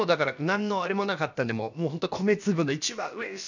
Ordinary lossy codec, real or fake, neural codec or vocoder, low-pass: none; fake; codec, 16 kHz, about 1 kbps, DyCAST, with the encoder's durations; 7.2 kHz